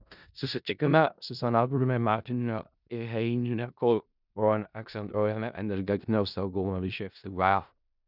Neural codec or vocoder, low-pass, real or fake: codec, 16 kHz in and 24 kHz out, 0.4 kbps, LongCat-Audio-Codec, four codebook decoder; 5.4 kHz; fake